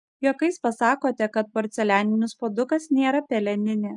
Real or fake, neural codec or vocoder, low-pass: real; none; 10.8 kHz